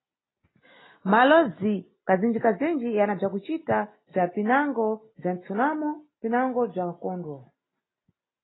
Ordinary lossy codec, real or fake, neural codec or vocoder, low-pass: AAC, 16 kbps; real; none; 7.2 kHz